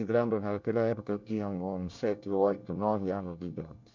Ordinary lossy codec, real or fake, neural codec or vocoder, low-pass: MP3, 64 kbps; fake; codec, 24 kHz, 1 kbps, SNAC; 7.2 kHz